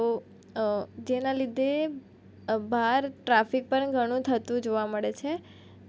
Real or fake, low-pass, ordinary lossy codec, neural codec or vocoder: real; none; none; none